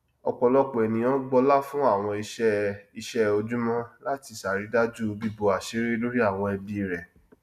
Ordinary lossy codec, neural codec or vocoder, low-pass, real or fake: none; none; 14.4 kHz; real